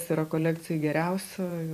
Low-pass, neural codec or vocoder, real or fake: 14.4 kHz; vocoder, 44.1 kHz, 128 mel bands every 512 samples, BigVGAN v2; fake